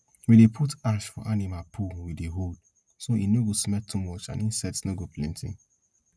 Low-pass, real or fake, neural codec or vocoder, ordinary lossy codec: none; real; none; none